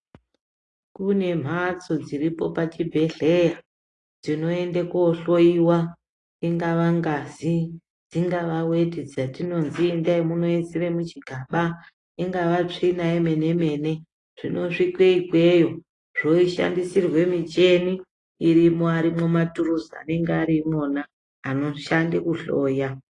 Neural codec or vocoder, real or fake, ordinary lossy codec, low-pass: none; real; AAC, 32 kbps; 10.8 kHz